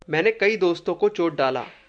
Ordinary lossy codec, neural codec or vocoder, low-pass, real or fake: MP3, 96 kbps; none; 9.9 kHz; real